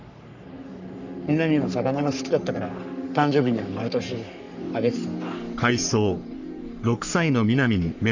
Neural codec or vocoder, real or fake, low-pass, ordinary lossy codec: codec, 44.1 kHz, 3.4 kbps, Pupu-Codec; fake; 7.2 kHz; none